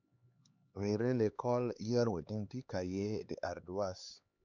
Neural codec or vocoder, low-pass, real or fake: codec, 16 kHz, 4 kbps, X-Codec, HuBERT features, trained on LibriSpeech; 7.2 kHz; fake